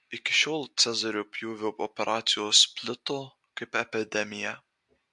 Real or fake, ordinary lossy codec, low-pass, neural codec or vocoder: real; MP3, 64 kbps; 10.8 kHz; none